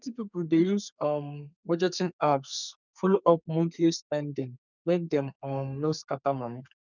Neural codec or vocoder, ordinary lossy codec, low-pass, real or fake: codec, 32 kHz, 1.9 kbps, SNAC; none; 7.2 kHz; fake